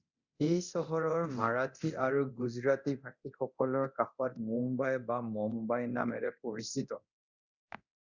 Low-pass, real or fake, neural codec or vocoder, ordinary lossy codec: 7.2 kHz; fake; codec, 24 kHz, 0.9 kbps, DualCodec; Opus, 64 kbps